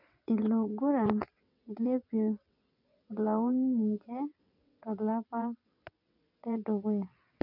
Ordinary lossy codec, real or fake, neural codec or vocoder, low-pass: none; fake; vocoder, 22.05 kHz, 80 mel bands, WaveNeXt; 5.4 kHz